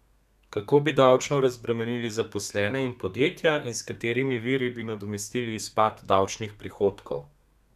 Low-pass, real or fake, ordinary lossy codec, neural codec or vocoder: 14.4 kHz; fake; none; codec, 32 kHz, 1.9 kbps, SNAC